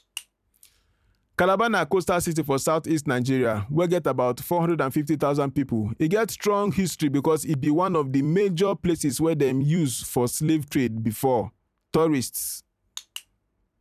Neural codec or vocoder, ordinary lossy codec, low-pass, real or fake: vocoder, 44.1 kHz, 128 mel bands every 256 samples, BigVGAN v2; none; 14.4 kHz; fake